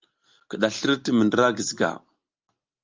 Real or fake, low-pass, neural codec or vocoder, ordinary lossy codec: real; 7.2 kHz; none; Opus, 32 kbps